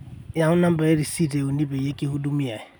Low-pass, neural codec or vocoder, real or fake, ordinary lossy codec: none; none; real; none